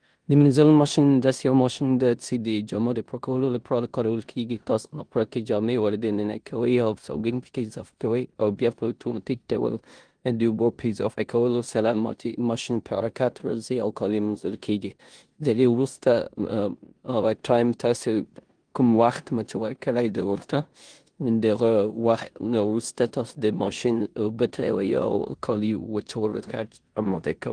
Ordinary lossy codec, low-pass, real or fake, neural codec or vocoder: Opus, 24 kbps; 9.9 kHz; fake; codec, 16 kHz in and 24 kHz out, 0.9 kbps, LongCat-Audio-Codec, four codebook decoder